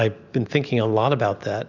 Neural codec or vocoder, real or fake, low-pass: none; real; 7.2 kHz